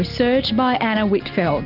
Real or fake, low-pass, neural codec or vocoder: real; 5.4 kHz; none